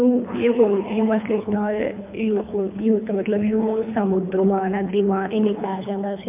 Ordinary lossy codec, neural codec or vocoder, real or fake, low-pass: MP3, 32 kbps; codec, 24 kHz, 3 kbps, HILCodec; fake; 3.6 kHz